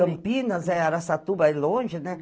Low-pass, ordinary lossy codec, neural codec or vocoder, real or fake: none; none; none; real